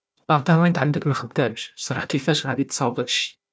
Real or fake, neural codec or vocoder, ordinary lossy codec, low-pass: fake; codec, 16 kHz, 1 kbps, FunCodec, trained on Chinese and English, 50 frames a second; none; none